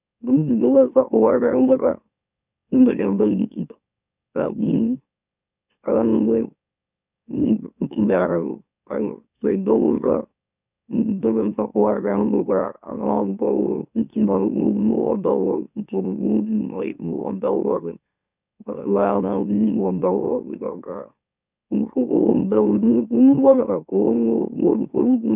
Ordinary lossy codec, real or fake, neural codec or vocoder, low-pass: none; fake; autoencoder, 44.1 kHz, a latent of 192 numbers a frame, MeloTTS; 3.6 kHz